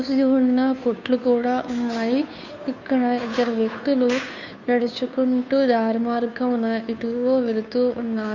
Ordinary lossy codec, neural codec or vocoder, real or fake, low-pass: none; codec, 16 kHz, 2 kbps, FunCodec, trained on Chinese and English, 25 frames a second; fake; 7.2 kHz